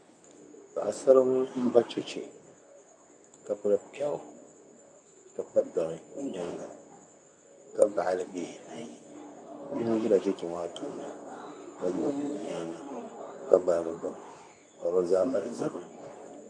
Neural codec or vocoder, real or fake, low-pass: codec, 24 kHz, 0.9 kbps, WavTokenizer, medium speech release version 2; fake; 9.9 kHz